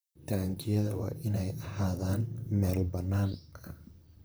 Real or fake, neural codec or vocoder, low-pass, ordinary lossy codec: fake; vocoder, 44.1 kHz, 128 mel bands, Pupu-Vocoder; none; none